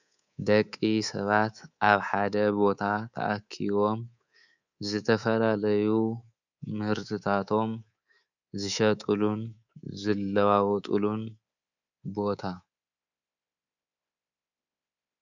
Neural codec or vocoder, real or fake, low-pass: codec, 24 kHz, 3.1 kbps, DualCodec; fake; 7.2 kHz